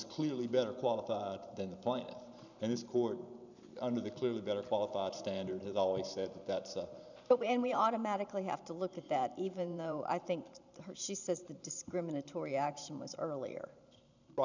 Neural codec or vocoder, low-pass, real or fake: vocoder, 44.1 kHz, 128 mel bands every 512 samples, BigVGAN v2; 7.2 kHz; fake